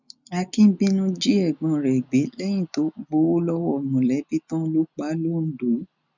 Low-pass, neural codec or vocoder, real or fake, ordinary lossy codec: 7.2 kHz; none; real; none